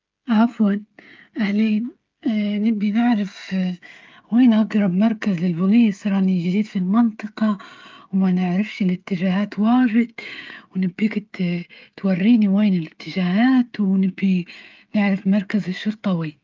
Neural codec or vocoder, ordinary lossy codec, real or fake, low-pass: codec, 16 kHz, 16 kbps, FreqCodec, smaller model; Opus, 32 kbps; fake; 7.2 kHz